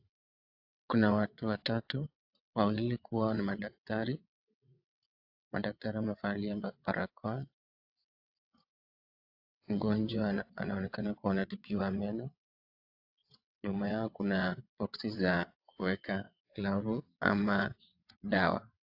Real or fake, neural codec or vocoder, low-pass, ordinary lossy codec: fake; vocoder, 22.05 kHz, 80 mel bands, WaveNeXt; 5.4 kHz; AAC, 48 kbps